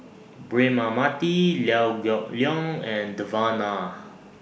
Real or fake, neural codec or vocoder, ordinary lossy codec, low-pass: real; none; none; none